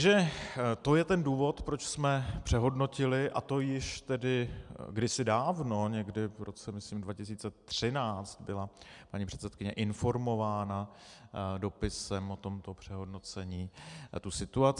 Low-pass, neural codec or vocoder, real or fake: 10.8 kHz; none; real